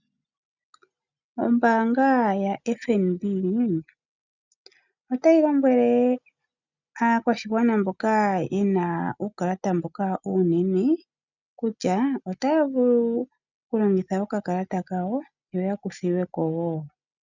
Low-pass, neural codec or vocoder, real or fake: 7.2 kHz; none; real